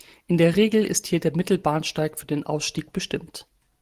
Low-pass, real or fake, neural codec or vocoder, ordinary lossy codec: 14.4 kHz; real; none; Opus, 24 kbps